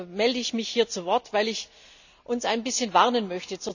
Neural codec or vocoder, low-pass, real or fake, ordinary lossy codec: none; 7.2 kHz; real; none